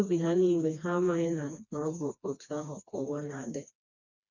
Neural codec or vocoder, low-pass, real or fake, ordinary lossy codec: codec, 16 kHz, 2 kbps, FreqCodec, smaller model; 7.2 kHz; fake; none